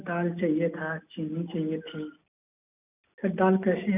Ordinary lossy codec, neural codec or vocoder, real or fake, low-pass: none; none; real; 3.6 kHz